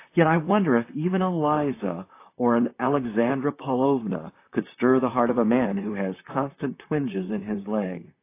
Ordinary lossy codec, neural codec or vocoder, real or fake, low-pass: AAC, 24 kbps; vocoder, 44.1 kHz, 128 mel bands, Pupu-Vocoder; fake; 3.6 kHz